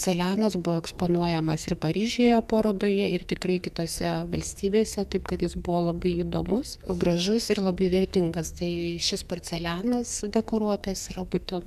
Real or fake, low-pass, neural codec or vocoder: fake; 14.4 kHz; codec, 44.1 kHz, 2.6 kbps, SNAC